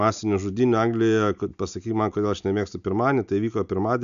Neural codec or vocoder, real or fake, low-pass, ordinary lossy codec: none; real; 7.2 kHz; MP3, 96 kbps